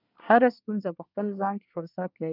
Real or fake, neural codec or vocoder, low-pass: fake; codec, 24 kHz, 1 kbps, SNAC; 5.4 kHz